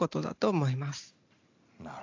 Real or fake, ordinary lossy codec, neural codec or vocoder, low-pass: real; AAC, 48 kbps; none; 7.2 kHz